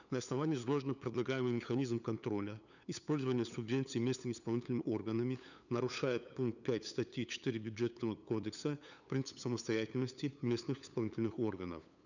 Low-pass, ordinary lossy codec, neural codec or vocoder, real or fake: 7.2 kHz; none; codec, 16 kHz, 8 kbps, FunCodec, trained on LibriTTS, 25 frames a second; fake